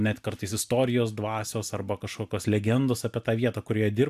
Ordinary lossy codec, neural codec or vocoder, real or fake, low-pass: AAC, 96 kbps; none; real; 14.4 kHz